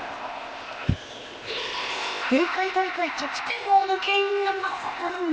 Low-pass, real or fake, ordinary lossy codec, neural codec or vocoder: none; fake; none; codec, 16 kHz, 0.8 kbps, ZipCodec